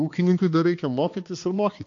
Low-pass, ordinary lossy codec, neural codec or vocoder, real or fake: 7.2 kHz; AAC, 48 kbps; codec, 16 kHz, 2 kbps, X-Codec, HuBERT features, trained on balanced general audio; fake